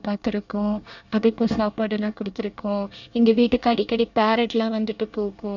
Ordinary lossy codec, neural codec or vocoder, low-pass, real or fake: none; codec, 24 kHz, 1 kbps, SNAC; 7.2 kHz; fake